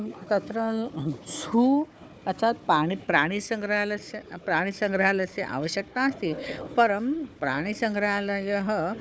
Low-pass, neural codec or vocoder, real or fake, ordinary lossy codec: none; codec, 16 kHz, 4 kbps, FunCodec, trained on Chinese and English, 50 frames a second; fake; none